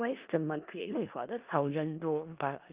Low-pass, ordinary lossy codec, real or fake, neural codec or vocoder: 3.6 kHz; Opus, 24 kbps; fake; codec, 16 kHz in and 24 kHz out, 0.4 kbps, LongCat-Audio-Codec, four codebook decoder